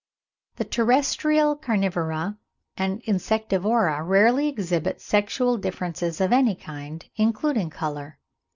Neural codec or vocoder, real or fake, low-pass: none; real; 7.2 kHz